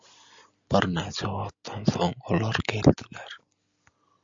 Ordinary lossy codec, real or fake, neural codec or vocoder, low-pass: MP3, 64 kbps; real; none; 7.2 kHz